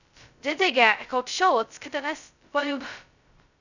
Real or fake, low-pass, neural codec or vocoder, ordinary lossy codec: fake; 7.2 kHz; codec, 16 kHz, 0.2 kbps, FocalCodec; none